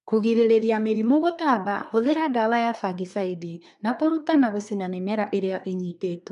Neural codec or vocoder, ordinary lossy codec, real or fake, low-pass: codec, 24 kHz, 1 kbps, SNAC; none; fake; 10.8 kHz